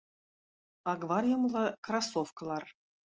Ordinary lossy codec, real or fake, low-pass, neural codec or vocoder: Opus, 24 kbps; real; 7.2 kHz; none